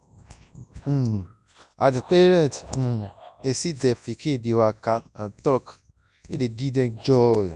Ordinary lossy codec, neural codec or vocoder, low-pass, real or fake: none; codec, 24 kHz, 0.9 kbps, WavTokenizer, large speech release; 10.8 kHz; fake